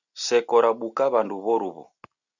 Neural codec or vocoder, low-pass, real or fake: none; 7.2 kHz; real